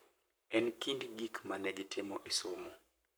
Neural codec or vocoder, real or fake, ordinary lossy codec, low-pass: codec, 44.1 kHz, 7.8 kbps, Pupu-Codec; fake; none; none